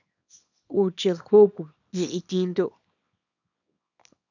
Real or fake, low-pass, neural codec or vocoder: fake; 7.2 kHz; codec, 24 kHz, 0.9 kbps, WavTokenizer, small release